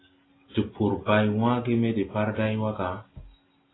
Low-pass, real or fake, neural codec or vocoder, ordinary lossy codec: 7.2 kHz; real; none; AAC, 16 kbps